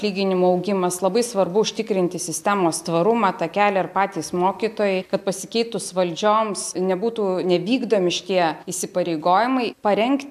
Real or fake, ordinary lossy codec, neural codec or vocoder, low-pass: real; AAC, 96 kbps; none; 14.4 kHz